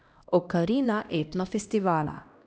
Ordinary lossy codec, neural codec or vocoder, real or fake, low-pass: none; codec, 16 kHz, 1 kbps, X-Codec, HuBERT features, trained on LibriSpeech; fake; none